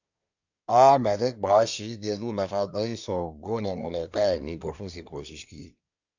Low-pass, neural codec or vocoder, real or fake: 7.2 kHz; codec, 24 kHz, 1 kbps, SNAC; fake